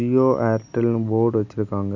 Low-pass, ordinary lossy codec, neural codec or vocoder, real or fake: 7.2 kHz; none; none; real